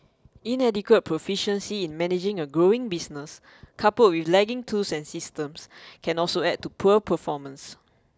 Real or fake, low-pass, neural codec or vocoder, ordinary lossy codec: real; none; none; none